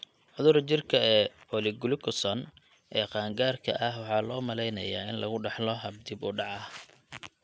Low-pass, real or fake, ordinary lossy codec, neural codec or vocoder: none; real; none; none